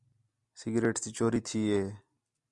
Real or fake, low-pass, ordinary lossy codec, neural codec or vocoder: real; 10.8 kHz; Opus, 64 kbps; none